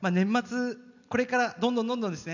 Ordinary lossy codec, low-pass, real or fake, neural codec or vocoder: none; 7.2 kHz; real; none